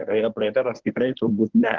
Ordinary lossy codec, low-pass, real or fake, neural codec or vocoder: Opus, 16 kbps; 7.2 kHz; fake; codec, 24 kHz, 0.9 kbps, WavTokenizer, medium speech release version 1